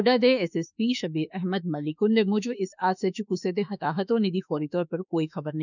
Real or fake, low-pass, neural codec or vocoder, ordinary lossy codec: fake; 7.2 kHz; autoencoder, 48 kHz, 32 numbers a frame, DAC-VAE, trained on Japanese speech; none